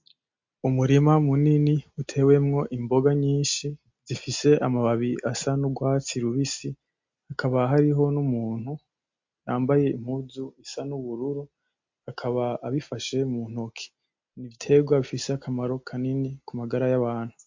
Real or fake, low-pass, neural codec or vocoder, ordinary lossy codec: real; 7.2 kHz; none; MP3, 48 kbps